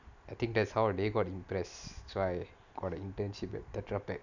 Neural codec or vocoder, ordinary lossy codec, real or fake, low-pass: none; none; real; 7.2 kHz